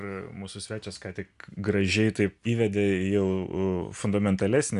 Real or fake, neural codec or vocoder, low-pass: real; none; 10.8 kHz